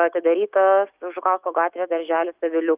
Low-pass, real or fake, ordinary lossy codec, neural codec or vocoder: 3.6 kHz; fake; Opus, 24 kbps; autoencoder, 48 kHz, 128 numbers a frame, DAC-VAE, trained on Japanese speech